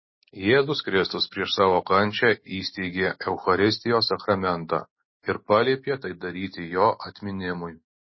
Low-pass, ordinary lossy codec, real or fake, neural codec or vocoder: 7.2 kHz; MP3, 24 kbps; real; none